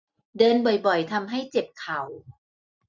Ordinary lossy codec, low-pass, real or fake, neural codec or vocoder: none; 7.2 kHz; real; none